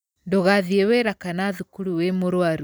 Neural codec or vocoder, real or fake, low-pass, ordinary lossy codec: vocoder, 44.1 kHz, 128 mel bands every 256 samples, BigVGAN v2; fake; none; none